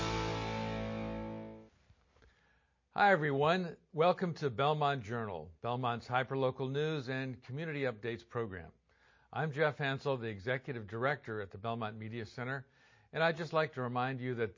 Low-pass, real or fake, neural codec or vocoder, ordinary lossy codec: 7.2 kHz; real; none; MP3, 32 kbps